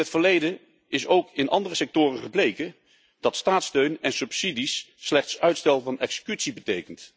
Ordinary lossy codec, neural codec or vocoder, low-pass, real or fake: none; none; none; real